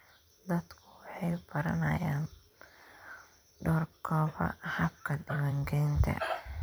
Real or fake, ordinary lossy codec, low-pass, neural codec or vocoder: fake; none; none; vocoder, 44.1 kHz, 128 mel bands every 256 samples, BigVGAN v2